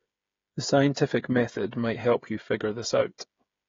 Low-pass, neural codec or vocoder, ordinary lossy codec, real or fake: 7.2 kHz; codec, 16 kHz, 8 kbps, FreqCodec, smaller model; AAC, 32 kbps; fake